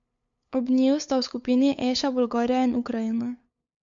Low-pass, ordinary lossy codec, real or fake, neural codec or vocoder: 7.2 kHz; MP3, 48 kbps; fake; codec, 16 kHz, 8 kbps, FunCodec, trained on LibriTTS, 25 frames a second